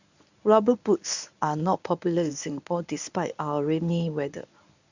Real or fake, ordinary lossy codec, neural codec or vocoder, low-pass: fake; none; codec, 24 kHz, 0.9 kbps, WavTokenizer, medium speech release version 1; 7.2 kHz